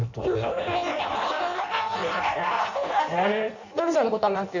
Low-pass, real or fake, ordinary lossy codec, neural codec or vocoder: 7.2 kHz; fake; none; codec, 16 kHz in and 24 kHz out, 0.6 kbps, FireRedTTS-2 codec